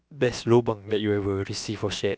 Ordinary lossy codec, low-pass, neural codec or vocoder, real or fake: none; none; codec, 16 kHz, about 1 kbps, DyCAST, with the encoder's durations; fake